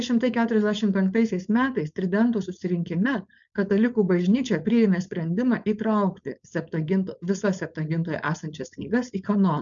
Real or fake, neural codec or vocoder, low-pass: fake; codec, 16 kHz, 4.8 kbps, FACodec; 7.2 kHz